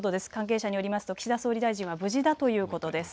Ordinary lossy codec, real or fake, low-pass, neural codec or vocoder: none; real; none; none